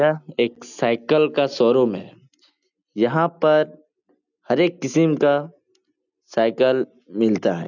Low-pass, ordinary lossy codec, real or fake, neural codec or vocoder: 7.2 kHz; none; real; none